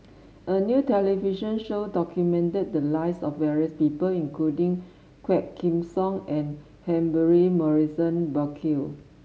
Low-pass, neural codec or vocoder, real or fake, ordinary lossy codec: none; none; real; none